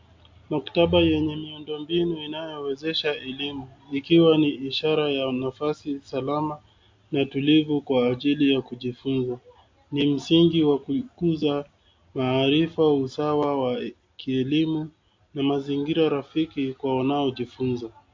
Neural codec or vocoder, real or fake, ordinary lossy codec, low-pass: none; real; MP3, 48 kbps; 7.2 kHz